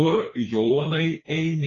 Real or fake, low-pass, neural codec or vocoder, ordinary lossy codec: fake; 7.2 kHz; codec, 16 kHz, 2 kbps, FreqCodec, larger model; AAC, 32 kbps